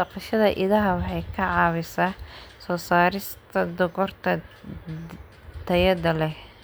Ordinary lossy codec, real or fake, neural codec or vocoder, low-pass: none; real; none; none